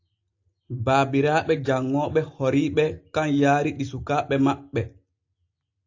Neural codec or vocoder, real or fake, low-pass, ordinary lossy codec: none; real; 7.2 kHz; AAC, 48 kbps